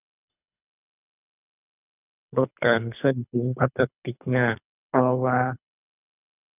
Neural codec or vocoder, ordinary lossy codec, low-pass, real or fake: codec, 24 kHz, 3 kbps, HILCodec; none; 3.6 kHz; fake